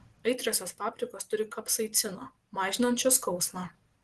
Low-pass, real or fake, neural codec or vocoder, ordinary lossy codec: 10.8 kHz; real; none; Opus, 16 kbps